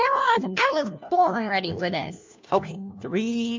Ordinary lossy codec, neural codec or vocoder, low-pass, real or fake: MP3, 64 kbps; codec, 16 kHz, 1 kbps, FunCodec, trained on LibriTTS, 50 frames a second; 7.2 kHz; fake